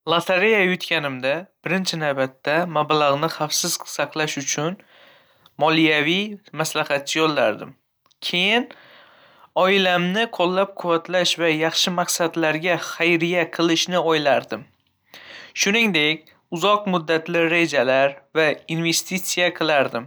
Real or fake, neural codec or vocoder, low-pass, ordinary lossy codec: real; none; none; none